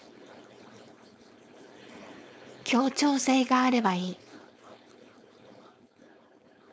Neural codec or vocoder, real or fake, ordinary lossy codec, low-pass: codec, 16 kHz, 4.8 kbps, FACodec; fake; none; none